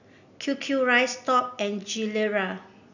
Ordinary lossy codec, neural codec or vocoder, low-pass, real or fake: none; none; 7.2 kHz; real